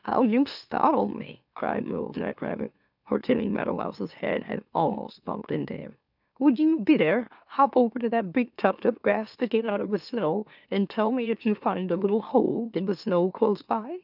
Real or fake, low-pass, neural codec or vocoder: fake; 5.4 kHz; autoencoder, 44.1 kHz, a latent of 192 numbers a frame, MeloTTS